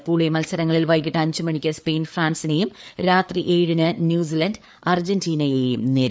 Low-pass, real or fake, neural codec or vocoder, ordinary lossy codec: none; fake; codec, 16 kHz, 4 kbps, FreqCodec, larger model; none